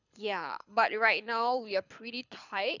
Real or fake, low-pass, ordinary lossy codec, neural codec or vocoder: fake; 7.2 kHz; none; codec, 24 kHz, 6 kbps, HILCodec